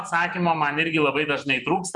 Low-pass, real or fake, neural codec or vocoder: 10.8 kHz; fake; vocoder, 24 kHz, 100 mel bands, Vocos